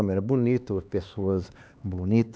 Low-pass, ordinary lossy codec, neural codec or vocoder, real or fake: none; none; codec, 16 kHz, 2 kbps, X-Codec, HuBERT features, trained on LibriSpeech; fake